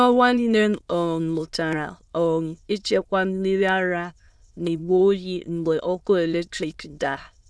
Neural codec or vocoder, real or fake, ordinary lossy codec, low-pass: autoencoder, 22.05 kHz, a latent of 192 numbers a frame, VITS, trained on many speakers; fake; none; none